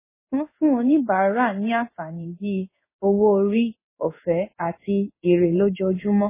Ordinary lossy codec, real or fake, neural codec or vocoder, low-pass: MP3, 16 kbps; fake; codec, 16 kHz in and 24 kHz out, 1 kbps, XY-Tokenizer; 3.6 kHz